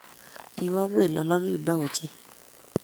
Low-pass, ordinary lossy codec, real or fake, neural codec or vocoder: none; none; fake; codec, 44.1 kHz, 2.6 kbps, SNAC